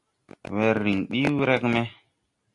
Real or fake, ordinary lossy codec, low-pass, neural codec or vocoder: real; AAC, 64 kbps; 10.8 kHz; none